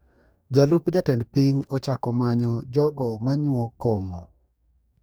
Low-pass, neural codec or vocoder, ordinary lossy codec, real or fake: none; codec, 44.1 kHz, 2.6 kbps, DAC; none; fake